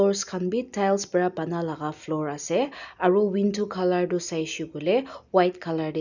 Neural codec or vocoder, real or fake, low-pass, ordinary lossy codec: none; real; 7.2 kHz; none